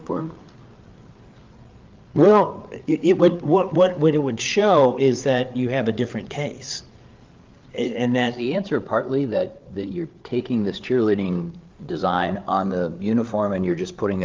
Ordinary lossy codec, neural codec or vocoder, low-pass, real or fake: Opus, 32 kbps; codec, 16 kHz, 8 kbps, FreqCodec, larger model; 7.2 kHz; fake